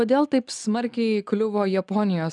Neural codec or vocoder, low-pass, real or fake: none; 10.8 kHz; real